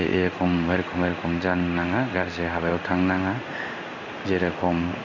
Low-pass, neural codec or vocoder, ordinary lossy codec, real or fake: 7.2 kHz; codec, 16 kHz in and 24 kHz out, 1 kbps, XY-Tokenizer; none; fake